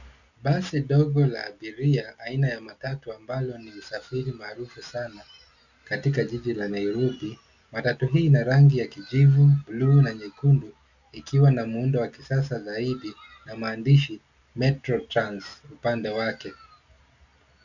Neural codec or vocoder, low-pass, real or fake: none; 7.2 kHz; real